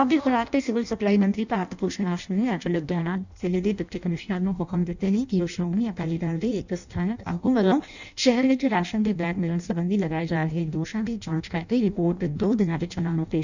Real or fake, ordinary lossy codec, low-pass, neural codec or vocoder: fake; none; 7.2 kHz; codec, 16 kHz in and 24 kHz out, 0.6 kbps, FireRedTTS-2 codec